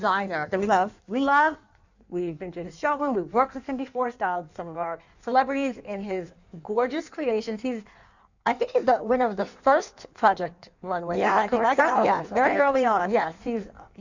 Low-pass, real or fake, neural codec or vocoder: 7.2 kHz; fake; codec, 16 kHz in and 24 kHz out, 1.1 kbps, FireRedTTS-2 codec